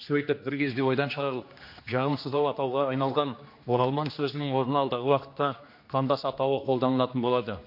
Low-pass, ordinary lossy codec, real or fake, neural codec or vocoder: 5.4 kHz; MP3, 32 kbps; fake; codec, 16 kHz, 2 kbps, X-Codec, HuBERT features, trained on general audio